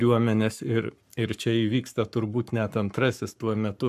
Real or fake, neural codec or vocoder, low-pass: fake; codec, 44.1 kHz, 7.8 kbps, Pupu-Codec; 14.4 kHz